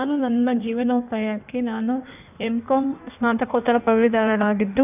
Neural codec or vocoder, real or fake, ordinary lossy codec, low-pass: codec, 16 kHz in and 24 kHz out, 1.1 kbps, FireRedTTS-2 codec; fake; none; 3.6 kHz